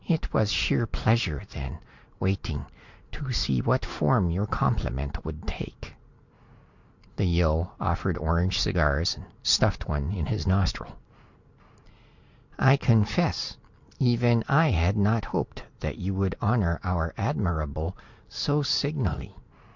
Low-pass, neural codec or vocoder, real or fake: 7.2 kHz; none; real